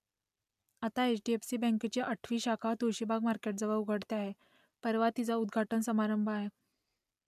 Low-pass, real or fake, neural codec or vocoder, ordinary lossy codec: 14.4 kHz; real; none; none